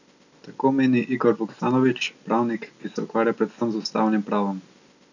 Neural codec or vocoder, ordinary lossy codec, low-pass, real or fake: none; none; 7.2 kHz; real